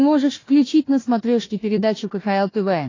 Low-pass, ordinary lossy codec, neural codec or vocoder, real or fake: 7.2 kHz; AAC, 32 kbps; codec, 16 kHz, 1 kbps, FunCodec, trained on Chinese and English, 50 frames a second; fake